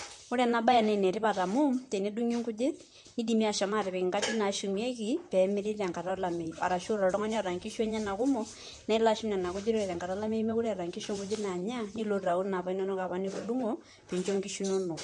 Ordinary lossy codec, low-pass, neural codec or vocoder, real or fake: MP3, 48 kbps; 10.8 kHz; vocoder, 44.1 kHz, 128 mel bands, Pupu-Vocoder; fake